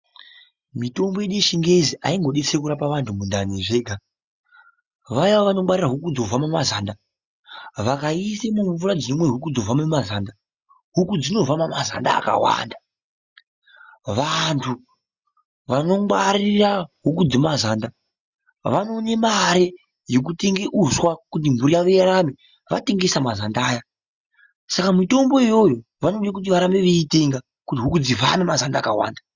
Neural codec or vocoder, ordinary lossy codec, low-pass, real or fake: none; Opus, 64 kbps; 7.2 kHz; real